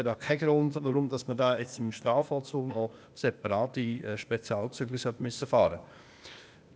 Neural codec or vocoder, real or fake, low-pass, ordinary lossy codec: codec, 16 kHz, 0.8 kbps, ZipCodec; fake; none; none